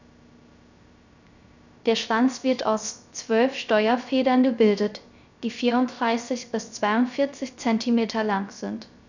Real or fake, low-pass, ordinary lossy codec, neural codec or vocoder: fake; 7.2 kHz; none; codec, 16 kHz, 0.3 kbps, FocalCodec